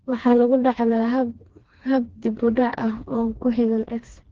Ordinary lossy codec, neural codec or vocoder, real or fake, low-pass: Opus, 16 kbps; codec, 16 kHz, 2 kbps, FreqCodec, smaller model; fake; 7.2 kHz